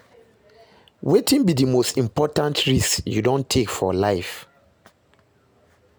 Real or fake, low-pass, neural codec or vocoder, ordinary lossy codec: real; none; none; none